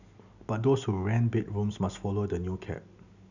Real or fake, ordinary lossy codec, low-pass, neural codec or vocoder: real; none; 7.2 kHz; none